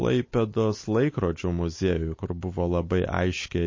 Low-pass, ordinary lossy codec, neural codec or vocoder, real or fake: 7.2 kHz; MP3, 32 kbps; none; real